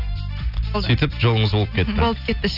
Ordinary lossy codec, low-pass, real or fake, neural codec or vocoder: none; 5.4 kHz; real; none